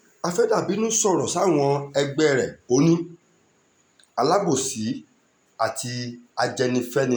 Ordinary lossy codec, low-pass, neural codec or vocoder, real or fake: none; none; none; real